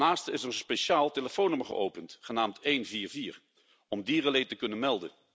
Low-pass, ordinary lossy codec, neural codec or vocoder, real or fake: none; none; none; real